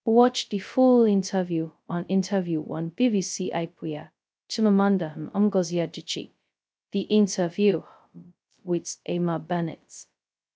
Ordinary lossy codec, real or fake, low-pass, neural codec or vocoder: none; fake; none; codec, 16 kHz, 0.2 kbps, FocalCodec